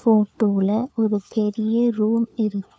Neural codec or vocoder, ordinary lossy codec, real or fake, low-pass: codec, 16 kHz, 4 kbps, FunCodec, trained on LibriTTS, 50 frames a second; none; fake; none